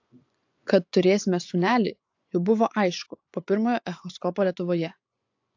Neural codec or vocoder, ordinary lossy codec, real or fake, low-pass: vocoder, 24 kHz, 100 mel bands, Vocos; AAC, 48 kbps; fake; 7.2 kHz